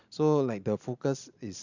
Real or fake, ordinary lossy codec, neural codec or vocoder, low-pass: real; none; none; 7.2 kHz